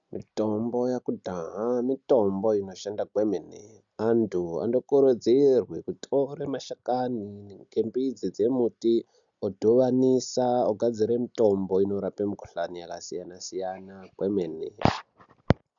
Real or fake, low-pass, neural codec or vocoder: real; 7.2 kHz; none